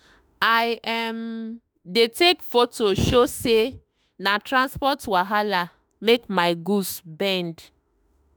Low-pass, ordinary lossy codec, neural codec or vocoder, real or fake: none; none; autoencoder, 48 kHz, 32 numbers a frame, DAC-VAE, trained on Japanese speech; fake